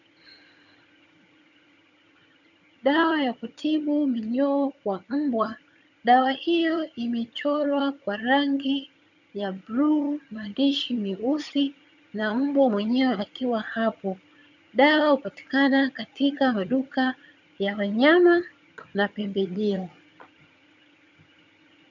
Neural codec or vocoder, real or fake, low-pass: vocoder, 22.05 kHz, 80 mel bands, HiFi-GAN; fake; 7.2 kHz